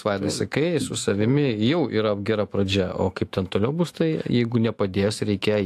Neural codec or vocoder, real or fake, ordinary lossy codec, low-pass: autoencoder, 48 kHz, 128 numbers a frame, DAC-VAE, trained on Japanese speech; fake; AAC, 64 kbps; 14.4 kHz